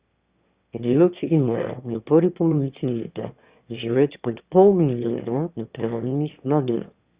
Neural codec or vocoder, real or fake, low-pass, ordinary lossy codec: autoencoder, 22.05 kHz, a latent of 192 numbers a frame, VITS, trained on one speaker; fake; 3.6 kHz; Opus, 64 kbps